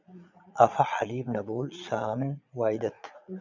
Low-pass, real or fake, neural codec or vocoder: 7.2 kHz; fake; vocoder, 22.05 kHz, 80 mel bands, Vocos